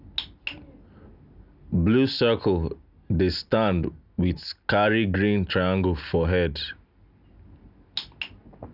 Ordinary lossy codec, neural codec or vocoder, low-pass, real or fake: none; none; 5.4 kHz; real